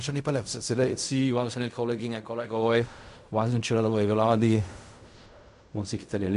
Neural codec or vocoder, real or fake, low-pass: codec, 16 kHz in and 24 kHz out, 0.4 kbps, LongCat-Audio-Codec, fine tuned four codebook decoder; fake; 10.8 kHz